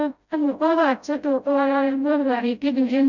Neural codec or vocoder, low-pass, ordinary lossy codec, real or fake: codec, 16 kHz, 0.5 kbps, FreqCodec, smaller model; 7.2 kHz; none; fake